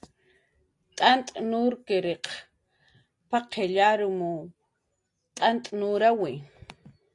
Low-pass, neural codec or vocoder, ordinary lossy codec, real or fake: 10.8 kHz; none; AAC, 48 kbps; real